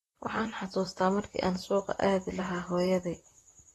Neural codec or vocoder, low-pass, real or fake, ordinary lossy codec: vocoder, 44.1 kHz, 128 mel bands, Pupu-Vocoder; 19.8 kHz; fake; AAC, 32 kbps